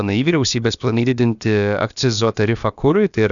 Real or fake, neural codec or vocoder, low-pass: fake; codec, 16 kHz, about 1 kbps, DyCAST, with the encoder's durations; 7.2 kHz